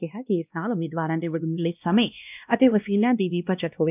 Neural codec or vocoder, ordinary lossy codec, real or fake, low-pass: codec, 16 kHz, 1 kbps, X-Codec, WavLM features, trained on Multilingual LibriSpeech; none; fake; 3.6 kHz